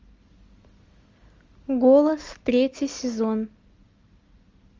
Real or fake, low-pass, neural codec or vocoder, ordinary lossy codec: real; 7.2 kHz; none; Opus, 32 kbps